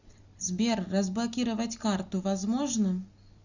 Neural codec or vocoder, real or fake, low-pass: none; real; 7.2 kHz